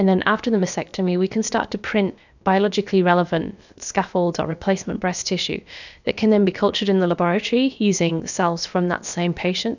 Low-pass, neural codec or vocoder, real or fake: 7.2 kHz; codec, 16 kHz, about 1 kbps, DyCAST, with the encoder's durations; fake